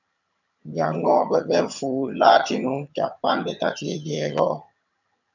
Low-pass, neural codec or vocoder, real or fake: 7.2 kHz; vocoder, 22.05 kHz, 80 mel bands, HiFi-GAN; fake